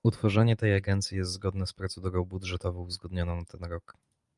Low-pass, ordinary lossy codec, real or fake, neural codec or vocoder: 10.8 kHz; Opus, 32 kbps; real; none